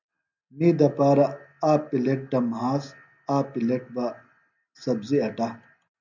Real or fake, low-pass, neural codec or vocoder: real; 7.2 kHz; none